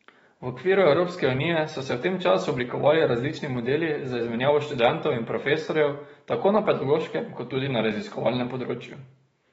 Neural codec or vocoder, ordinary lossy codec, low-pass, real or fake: autoencoder, 48 kHz, 128 numbers a frame, DAC-VAE, trained on Japanese speech; AAC, 24 kbps; 19.8 kHz; fake